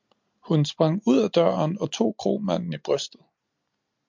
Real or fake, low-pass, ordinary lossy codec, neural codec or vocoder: real; 7.2 kHz; MP3, 64 kbps; none